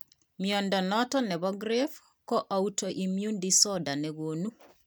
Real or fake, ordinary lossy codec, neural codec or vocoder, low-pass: real; none; none; none